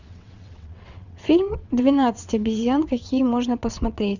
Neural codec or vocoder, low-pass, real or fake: vocoder, 22.05 kHz, 80 mel bands, WaveNeXt; 7.2 kHz; fake